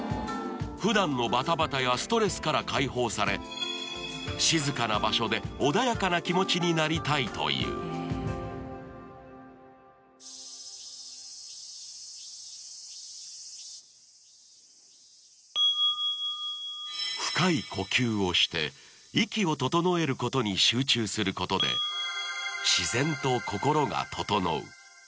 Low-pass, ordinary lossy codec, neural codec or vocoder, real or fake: none; none; none; real